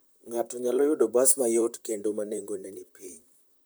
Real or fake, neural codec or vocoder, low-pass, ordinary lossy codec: fake; vocoder, 44.1 kHz, 128 mel bands, Pupu-Vocoder; none; none